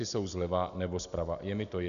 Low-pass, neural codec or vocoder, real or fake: 7.2 kHz; none; real